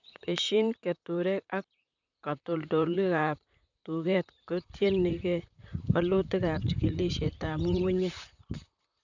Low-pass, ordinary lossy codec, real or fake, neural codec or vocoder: 7.2 kHz; none; fake; vocoder, 44.1 kHz, 128 mel bands, Pupu-Vocoder